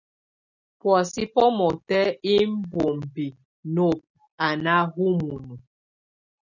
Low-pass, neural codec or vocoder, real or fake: 7.2 kHz; none; real